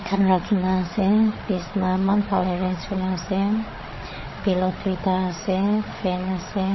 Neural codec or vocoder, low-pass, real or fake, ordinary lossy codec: codec, 16 kHz, 8 kbps, FreqCodec, larger model; 7.2 kHz; fake; MP3, 24 kbps